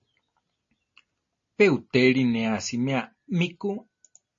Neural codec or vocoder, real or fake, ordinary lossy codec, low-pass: none; real; MP3, 32 kbps; 7.2 kHz